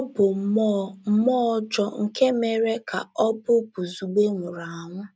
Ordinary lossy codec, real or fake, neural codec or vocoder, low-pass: none; real; none; none